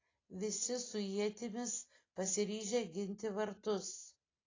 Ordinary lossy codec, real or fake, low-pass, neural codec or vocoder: AAC, 32 kbps; real; 7.2 kHz; none